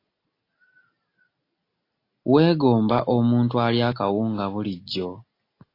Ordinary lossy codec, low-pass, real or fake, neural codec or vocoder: AAC, 32 kbps; 5.4 kHz; real; none